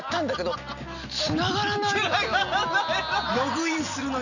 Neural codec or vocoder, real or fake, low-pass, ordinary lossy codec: none; real; 7.2 kHz; none